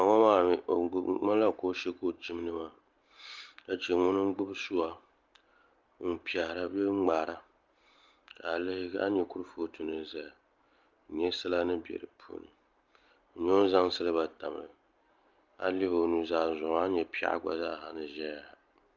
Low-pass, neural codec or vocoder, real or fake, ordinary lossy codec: 7.2 kHz; none; real; Opus, 24 kbps